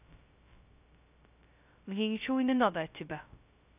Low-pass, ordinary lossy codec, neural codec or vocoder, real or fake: 3.6 kHz; none; codec, 16 kHz, 0.2 kbps, FocalCodec; fake